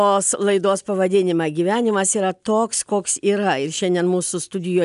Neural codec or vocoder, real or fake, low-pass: none; real; 10.8 kHz